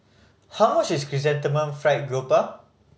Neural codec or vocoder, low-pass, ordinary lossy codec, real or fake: none; none; none; real